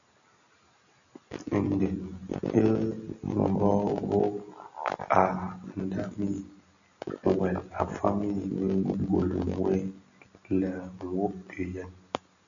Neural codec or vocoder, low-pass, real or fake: none; 7.2 kHz; real